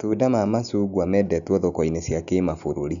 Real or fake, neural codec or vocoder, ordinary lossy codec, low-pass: real; none; none; 7.2 kHz